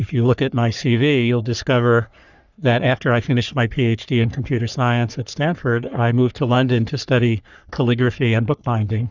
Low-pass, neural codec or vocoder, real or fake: 7.2 kHz; codec, 44.1 kHz, 3.4 kbps, Pupu-Codec; fake